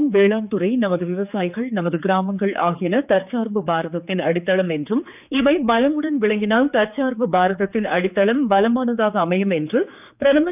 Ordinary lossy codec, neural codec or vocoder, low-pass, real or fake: none; codec, 16 kHz, 4 kbps, X-Codec, HuBERT features, trained on general audio; 3.6 kHz; fake